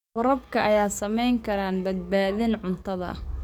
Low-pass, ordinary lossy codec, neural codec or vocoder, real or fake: 19.8 kHz; none; codec, 44.1 kHz, 7.8 kbps, DAC; fake